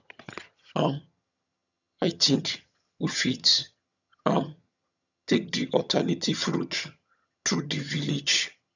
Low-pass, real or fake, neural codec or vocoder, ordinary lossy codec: 7.2 kHz; fake; vocoder, 22.05 kHz, 80 mel bands, HiFi-GAN; none